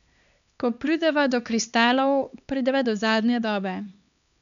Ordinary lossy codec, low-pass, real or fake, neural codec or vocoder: none; 7.2 kHz; fake; codec, 16 kHz, 2 kbps, X-Codec, HuBERT features, trained on LibriSpeech